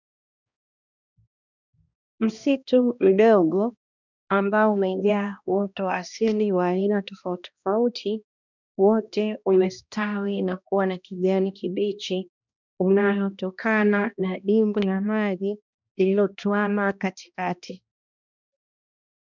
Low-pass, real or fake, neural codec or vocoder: 7.2 kHz; fake; codec, 16 kHz, 1 kbps, X-Codec, HuBERT features, trained on balanced general audio